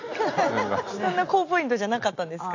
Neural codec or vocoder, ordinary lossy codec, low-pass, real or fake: none; AAC, 48 kbps; 7.2 kHz; real